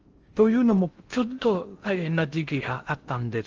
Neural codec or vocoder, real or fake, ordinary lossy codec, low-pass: codec, 16 kHz in and 24 kHz out, 0.6 kbps, FocalCodec, streaming, 4096 codes; fake; Opus, 24 kbps; 7.2 kHz